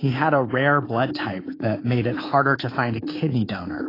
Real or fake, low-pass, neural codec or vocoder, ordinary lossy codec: fake; 5.4 kHz; codec, 24 kHz, 3.1 kbps, DualCodec; AAC, 24 kbps